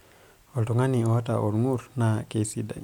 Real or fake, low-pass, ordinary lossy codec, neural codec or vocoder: real; 19.8 kHz; MP3, 96 kbps; none